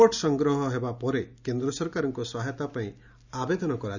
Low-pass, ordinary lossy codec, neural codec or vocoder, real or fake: 7.2 kHz; none; none; real